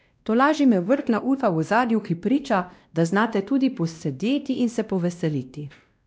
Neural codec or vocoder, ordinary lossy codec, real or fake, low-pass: codec, 16 kHz, 1 kbps, X-Codec, WavLM features, trained on Multilingual LibriSpeech; none; fake; none